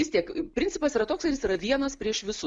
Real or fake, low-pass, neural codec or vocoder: real; 9.9 kHz; none